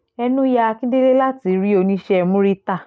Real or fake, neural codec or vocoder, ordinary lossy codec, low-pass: real; none; none; none